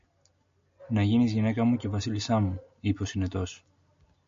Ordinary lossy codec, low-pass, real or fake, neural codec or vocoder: MP3, 48 kbps; 7.2 kHz; real; none